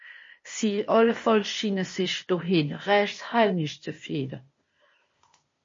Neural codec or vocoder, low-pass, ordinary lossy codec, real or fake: codec, 16 kHz, 0.8 kbps, ZipCodec; 7.2 kHz; MP3, 32 kbps; fake